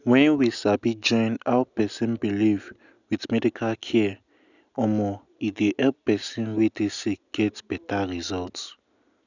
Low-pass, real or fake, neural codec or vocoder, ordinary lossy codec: 7.2 kHz; real; none; none